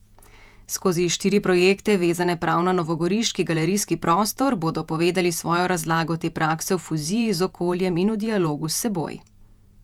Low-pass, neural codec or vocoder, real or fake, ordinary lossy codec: 19.8 kHz; vocoder, 48 kHz, 128 mel bands, Vocos; fake; none